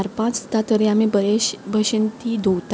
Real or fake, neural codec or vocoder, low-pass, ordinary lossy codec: real; none; none; none